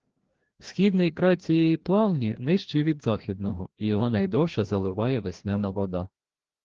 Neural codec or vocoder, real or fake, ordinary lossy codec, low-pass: codec, 16 kHz, 1 kbps, FreqCodec, larger model; fake; Opus, 16 kbps; 7.2 kHz